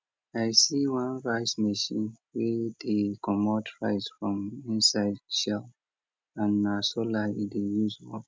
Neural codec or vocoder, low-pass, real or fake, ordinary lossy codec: none; none; real; none